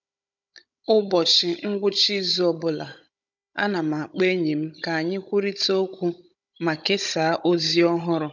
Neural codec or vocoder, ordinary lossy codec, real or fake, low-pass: codec, 16 kHz, 16 kbps, FunCodec, trained on Chinese and English, 50 frames a second; none; fake; 7.2 kHz